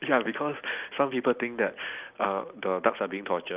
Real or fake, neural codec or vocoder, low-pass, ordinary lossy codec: real; none; 3.6 kHz; Opus, 24 kbps